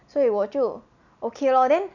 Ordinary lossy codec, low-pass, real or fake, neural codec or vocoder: none; 7.2 kHz; real; none